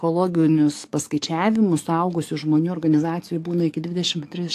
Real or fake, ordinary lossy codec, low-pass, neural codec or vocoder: fake; AAC, 64 kbps; 14.4 kHz; codec, 44.1 kHz, 7.8 kbps, DAC